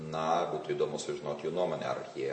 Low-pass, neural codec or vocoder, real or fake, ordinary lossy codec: 9.9 kHz; none; real; MP3, 32 kbps